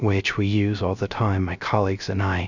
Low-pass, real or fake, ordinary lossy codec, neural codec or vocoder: 7.2 kHz; fake; Opus, 64 kbps; codec, 16 kHz, 0.3 kbps, FocalCodec